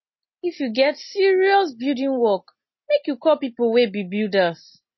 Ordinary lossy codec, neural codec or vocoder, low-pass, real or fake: MP3, 24 kbps; none; 7.2 kHz; real